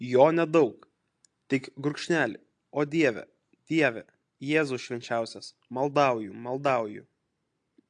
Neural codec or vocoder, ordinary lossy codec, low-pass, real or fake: none; AAC, 64 kbps; 9.9 kHz; real